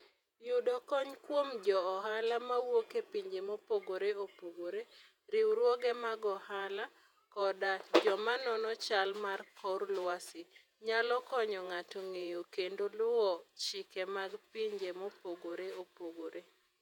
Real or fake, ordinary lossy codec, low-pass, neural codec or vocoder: fake; none; 19.8 kHz; vocoder, 48 kHz, 128 mel bands, Vocos